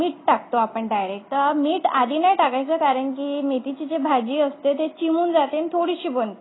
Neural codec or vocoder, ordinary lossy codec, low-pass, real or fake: none; AAC, 16 kbps; 7.2 kHz; real